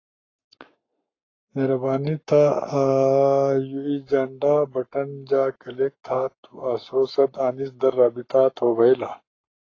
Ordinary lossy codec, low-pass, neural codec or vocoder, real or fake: AAC, 32 kbps; 7.2 kHz; codec, 44.1 kHz, 7.8 kbps, Pupu-Codec; fake